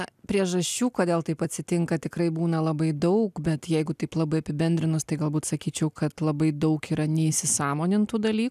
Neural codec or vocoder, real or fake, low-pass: none; real; 14.4 kHz